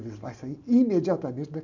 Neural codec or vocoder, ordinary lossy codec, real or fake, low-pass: none; none; real; 7.2 kHz